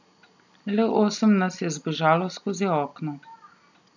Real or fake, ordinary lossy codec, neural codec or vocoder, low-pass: real; none; none; 7.2 kHz